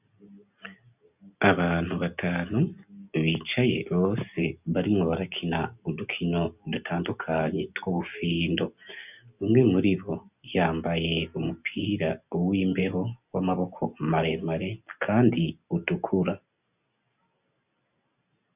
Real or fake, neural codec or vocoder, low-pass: real; none; 3.6 kHz